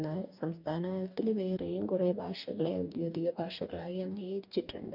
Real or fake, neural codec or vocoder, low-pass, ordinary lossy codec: fake; codec, 44.1 kHz, 2.6 kbps, DAC; 5.4 kHz; none